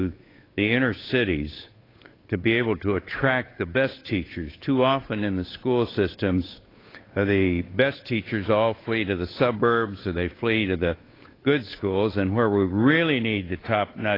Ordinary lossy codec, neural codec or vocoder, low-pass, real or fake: AAC, 24 kbps; codec, 16 kHz, 8 kbps, FunCodec, trained on Chinese and English, 25 frames a second; 5.4 kHz; fake